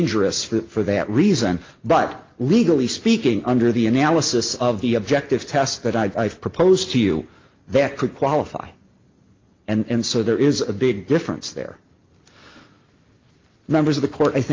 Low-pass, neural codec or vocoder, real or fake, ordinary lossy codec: 7.2 kHz; none; real; Opus, 24 kbps